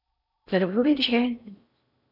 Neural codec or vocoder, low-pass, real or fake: codec, 16 kHz in and 24 kHz out, 0.6 kbps, FocalCodec, streaming, 4096 codes; 5.4 kHz; fake